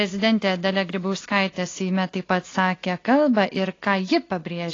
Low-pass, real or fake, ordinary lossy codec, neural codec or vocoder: 7.2 kHz; real; AAC, 32 kbps; none